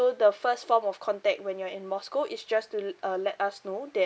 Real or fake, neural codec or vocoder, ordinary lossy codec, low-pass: real; none; none; none